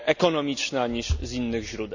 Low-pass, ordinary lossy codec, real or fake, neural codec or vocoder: 7.2 kHz; none; real; none